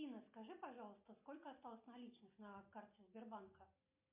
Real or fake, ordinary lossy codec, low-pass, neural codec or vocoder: real; MP3, 32 kbps; 3.6 kHz; none